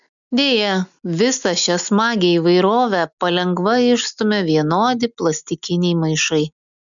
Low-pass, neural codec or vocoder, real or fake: 7.2 kHz; none; real